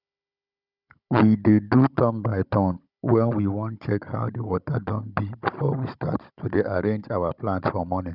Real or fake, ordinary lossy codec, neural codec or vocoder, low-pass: fake; none; codec, 16 kHz, 16 kbps, FunCodec, trained on Chinese and English, 50 frames a second; 5.4 kHz